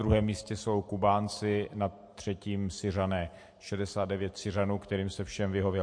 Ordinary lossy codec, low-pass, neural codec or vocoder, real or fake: MP3, 48 kbps; 9.9 kHz; none; real